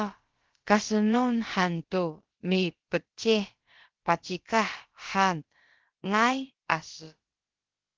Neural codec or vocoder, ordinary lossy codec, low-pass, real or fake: codec, 16 kHz, about 1 kbps, DyCAST, with the encoder's durations; Opus, 16 kbps; 7.2 kHz; fake